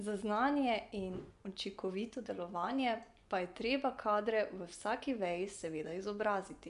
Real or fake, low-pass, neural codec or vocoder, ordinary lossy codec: fake; 10.8 kHz; vocoder, 24 kHz, 100 mel bands, Vocos; none